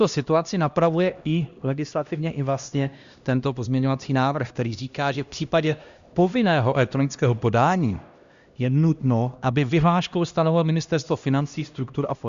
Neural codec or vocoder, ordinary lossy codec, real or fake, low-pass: codec, 16 kHz, 1 kbps, X-Codec, HuBERT features, trained on LibriSpeech; Opus, 64 kbps; fake; 7.2 kHz